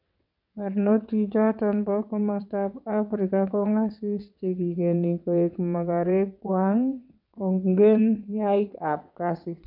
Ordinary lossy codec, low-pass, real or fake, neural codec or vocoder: none; 5.4 kHz; fake; vocoder, 22.05 kHz, 80 mel bands, WaveNeXt